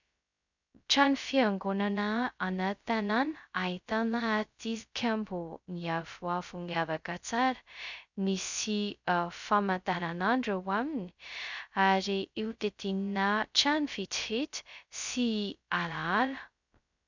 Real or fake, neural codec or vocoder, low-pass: fake; codec, 16 kHz, 0.2 kbps, FocalCodec; 7.2 kHz